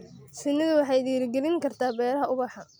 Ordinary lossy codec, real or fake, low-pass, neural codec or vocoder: none; real; none; none